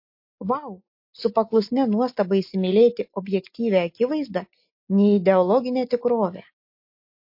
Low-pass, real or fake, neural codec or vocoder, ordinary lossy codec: 5.4 kHz; real; none; MP3, 32 kbps